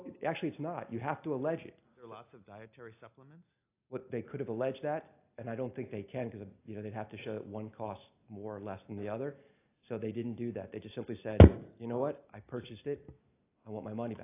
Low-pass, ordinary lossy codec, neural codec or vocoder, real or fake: 3.6 kHz; AAC, 24 kbps; none; real